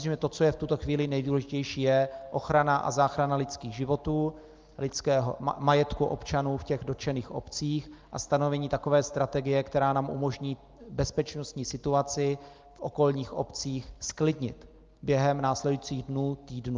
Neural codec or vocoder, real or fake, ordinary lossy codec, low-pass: none; real; Opus, 32 kbps; 7.2 kHz